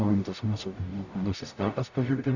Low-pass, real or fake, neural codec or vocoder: 7.2 kHz; fake; codec, 44.1 kHz, 0.9 kbps, DAC